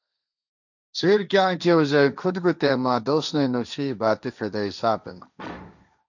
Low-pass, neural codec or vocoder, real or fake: 7.2 kHz; codec, 16 kHz, 1.1 kbps, Voila-Tokenizer; fake